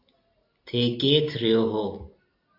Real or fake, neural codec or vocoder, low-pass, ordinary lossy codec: real; none; 5.4 kHz; AAC, 32 kbps